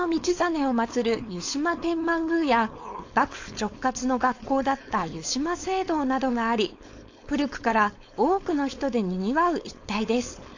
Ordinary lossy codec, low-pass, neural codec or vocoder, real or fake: AAC, 48 kbps; 7.2 kHz; codec, 16 kHz, 4.8 kbps, FACodec; fake